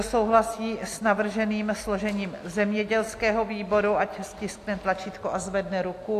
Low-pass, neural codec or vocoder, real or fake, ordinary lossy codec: 14.4 kHz; none; real; AAC, 64 kbps